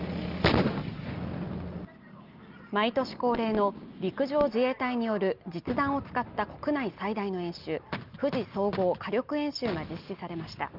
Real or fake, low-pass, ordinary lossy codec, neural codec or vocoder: real; 5.4 kHz; Opus, 24 kbps; none